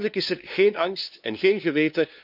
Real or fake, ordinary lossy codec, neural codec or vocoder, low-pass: fake; none; codec, 16 kHz, 2 kbps, FunCodec, trained on LibriTTS, 25 frames a second; 5.4 kHz